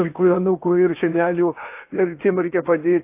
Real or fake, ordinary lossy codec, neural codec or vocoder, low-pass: fake; AAC, 24 kbps; codec, 16 kHz in and 24 kHz out, 0.8 kbps, FocalCodec, streaming, 65536 codes; 3.6 kHz